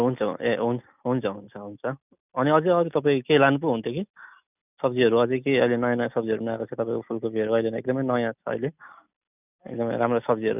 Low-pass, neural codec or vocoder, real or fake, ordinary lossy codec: 3.6 kHz; none; real; none